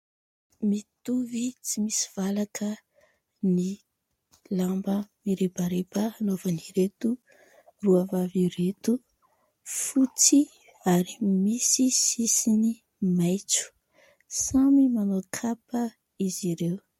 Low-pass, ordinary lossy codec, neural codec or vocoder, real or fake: 19.8 kHz; MP3, 64 kbps; none; real